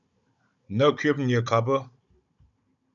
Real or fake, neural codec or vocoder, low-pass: fake; codec, 16 kHz, 16 kbps, FunCodec, trained on Chinese and English, 50 frames a second; 7.2 kHz